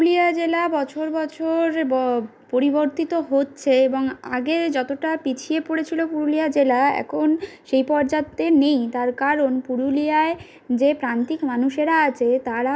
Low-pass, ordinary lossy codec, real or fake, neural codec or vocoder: none; none; real; none